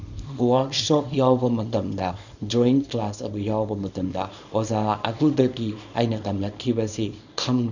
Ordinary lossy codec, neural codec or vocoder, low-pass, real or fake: none; codec, 24 kHz, 0.9 kbps, WavTokenizer, small release; 7.2 kHz; fake